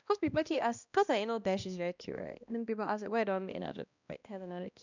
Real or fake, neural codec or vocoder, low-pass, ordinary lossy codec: fake; codec, 16 kHz, 1 kbps, X-Codec, HuBERT features, trained on balanced general audio; 7.2 kHz; none